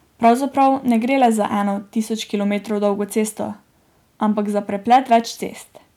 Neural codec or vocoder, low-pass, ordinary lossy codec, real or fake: none; 19.8 kHz; none; real